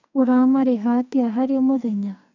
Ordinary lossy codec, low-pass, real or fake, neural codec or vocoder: none; 7.2 kHz; fake; codec, 44.1 kHz, 2.6 kbps, SNAC